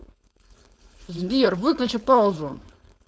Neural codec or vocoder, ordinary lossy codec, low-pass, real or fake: codec, 16 kHz, 4.8 kbps, FACodec; none; none; fake